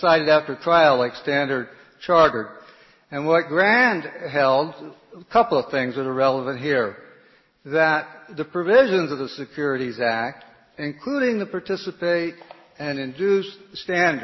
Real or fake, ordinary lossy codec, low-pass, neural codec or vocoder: real; MP3, 24 kbps; 7.2 kHz; none